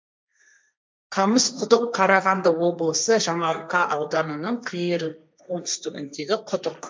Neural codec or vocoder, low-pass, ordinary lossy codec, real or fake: codec, 16 kHz, 1.1 kbps, Voila-Tokenizer; none; none; fake